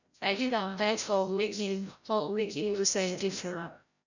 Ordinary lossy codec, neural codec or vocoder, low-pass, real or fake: none; codec, 16 kHz, 0.5 kbps, FreqCodec, larger model; 7.2 kHz; fake